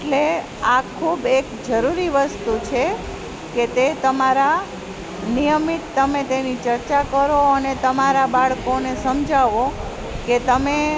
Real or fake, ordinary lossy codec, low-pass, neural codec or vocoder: real; none; none; none